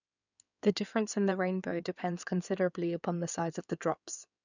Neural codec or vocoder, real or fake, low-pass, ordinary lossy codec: codec, 16 kHz in and 24 kHz out, 2.2 kbps, FireRedTTS-2 codec; fake; 7.2 kHz; none